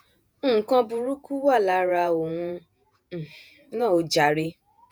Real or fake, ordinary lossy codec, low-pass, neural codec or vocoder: fake; none; none; vocoder, 48 kHz, 128 mel bands, Vocos